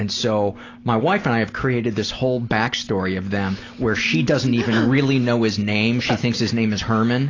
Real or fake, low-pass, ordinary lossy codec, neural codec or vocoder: real; 7.2 kHz; AAC, 32 kbps; none